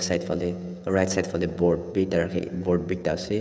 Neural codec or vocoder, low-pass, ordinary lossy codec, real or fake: codec, 16 kHz, 16 kbps, FreqCodec, smaller model; none; none; fake